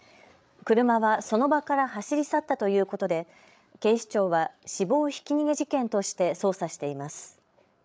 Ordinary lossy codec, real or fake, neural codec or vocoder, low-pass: none; fake; codec, 16 kHz, 8 kbps, FreqCodec, larger model; none